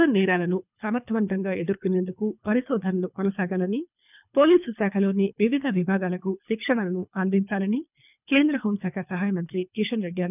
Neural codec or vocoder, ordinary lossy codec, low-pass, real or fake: codec, 24 kHz, 3 kbps, HILCodec; none; 3.6 kHz; fake